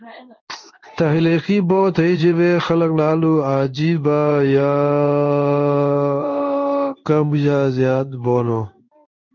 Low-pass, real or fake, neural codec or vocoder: 7.2 kHz; fake; codec, 16 kHz in and 24 kHz out, 1 kbps, XY-Tokenizer